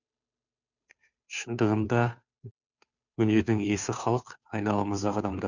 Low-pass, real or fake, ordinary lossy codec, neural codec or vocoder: 7.2 kHz; fake; none; codec, 16 kHz, 2 kbps, FunCodec, trained on Chinese and English, 25 frames a second